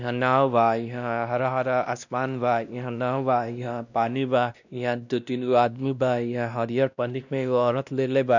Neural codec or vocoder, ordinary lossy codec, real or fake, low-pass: codec, 16 kHz, 1 kbps, X-Codec, WavLM features, trained on Multilingual LibriSpeech; none; fake; 7.2 kHz